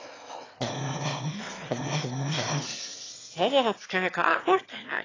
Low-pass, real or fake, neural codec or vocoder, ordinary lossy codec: 7.2 kHz; fake; autoencoder, 22.05 kHz, a latent of 192 numbers a frame, VITS, trained on one speaker; AAC, 32 kbps